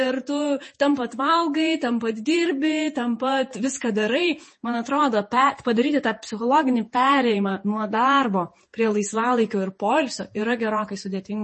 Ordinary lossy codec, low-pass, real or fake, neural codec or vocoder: MP3, 32 kbps; 10.8 kHz; fake; vocoder, 48 kHz, 128 mel bands, Vocos